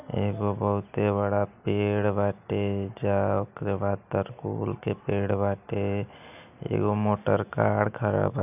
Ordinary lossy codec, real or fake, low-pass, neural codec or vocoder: none; real; 3.6 kHz; none